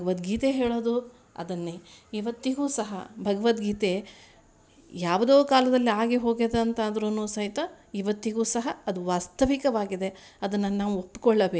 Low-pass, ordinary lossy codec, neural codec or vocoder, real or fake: none; none; none; real